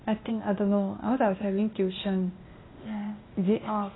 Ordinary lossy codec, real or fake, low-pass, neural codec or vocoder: AAC, 16 kbps; fake; 7.2 kHz; codec, 16 kHz, 0.8 kbps, ZipCodec